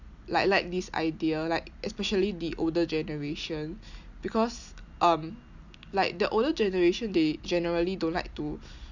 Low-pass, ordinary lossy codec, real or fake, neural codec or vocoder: 7.2 kHz; none; real; none